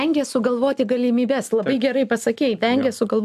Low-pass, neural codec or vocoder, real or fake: 14.4 kHz; none; real